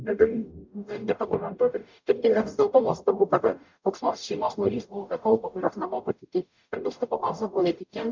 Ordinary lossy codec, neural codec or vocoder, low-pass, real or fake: MP3, 64 kbps; codec, 44.1 kHz, 0.9 kbps, DAC; 7.2 kHz; fake